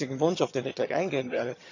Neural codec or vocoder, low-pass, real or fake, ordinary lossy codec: vocoder, 22.05 kHz, 80 mel bands, HiFi-GAN; 7.2 kHz; fake; none